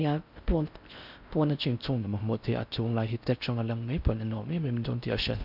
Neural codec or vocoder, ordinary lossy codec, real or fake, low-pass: codec, 16 kHz in and 24 kHz out, 0.6 kbps, FocalCodec, streaming, 4096 codes; none; fake; 5.4 kHz